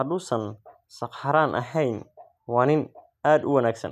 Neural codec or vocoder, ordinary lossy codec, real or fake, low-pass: none; none; real; 14.4 kHz